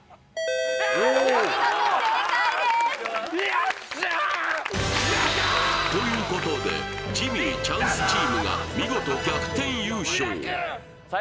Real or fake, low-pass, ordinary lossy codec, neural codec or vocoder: real; none; none; none